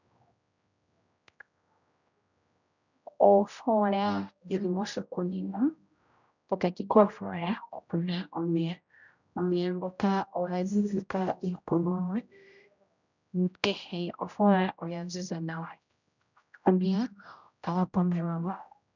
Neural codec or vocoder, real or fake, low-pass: codec, 16 kHz, 0.5 kbps, X-Codec, HuBERT features, trained on general audio; fake; 7.2 kHz